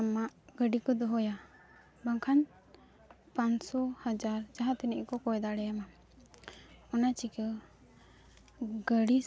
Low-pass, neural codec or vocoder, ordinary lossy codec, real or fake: none; none; none; real